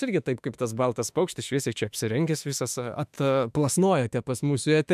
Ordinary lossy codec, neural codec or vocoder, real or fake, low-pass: MP3, 96 kbps; autoencoder, 48 kHz, 32 numbers a frame, DAC-VAE, trained on Japanese speech; fake; 14.4 kHz